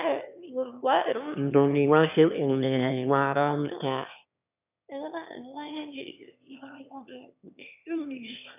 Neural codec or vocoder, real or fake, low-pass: autoencoder, 22.05 kHz, a latent of 192 numbers a frame, VITS, trained on one speaker; fake; 3.6 kHz